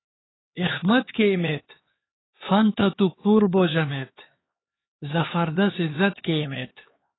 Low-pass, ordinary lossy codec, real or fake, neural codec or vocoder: 7.2 kHz; AAC, 16 kbps; fake; codec, 16 kHz, 4 kbps, X-Codec, HuBERT features, trained on LibriSpeech